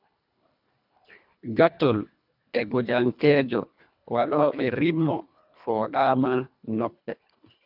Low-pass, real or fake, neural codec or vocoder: 5.4 kHz; fake; codec, 24 kHz, 1.5 kbps, HILCodec